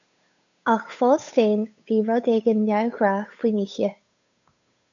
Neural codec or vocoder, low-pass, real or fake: codec, 16 kHz, 8 kbps, FunCodec, trained on Chinese and English, 25 frames a second; 7.2 kHz; fake